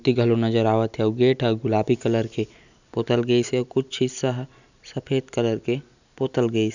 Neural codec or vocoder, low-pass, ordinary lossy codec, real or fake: none; 7.2 kHz; none; real